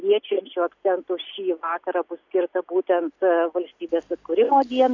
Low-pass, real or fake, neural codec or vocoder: 7.2 kHz; real; none